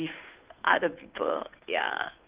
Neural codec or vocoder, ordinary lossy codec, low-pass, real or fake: codec, 16 kHz, 2 kbps, FunCodec, trained on Chinese and English, 25 frames a second; Opus, 32 kbps; 3.6 kHz; fake